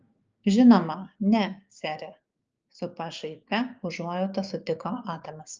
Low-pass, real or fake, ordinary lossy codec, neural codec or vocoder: 7.2 kHz; fake; Opus, 24 kbps; codec, 16 kHz, 6 kbps, DAC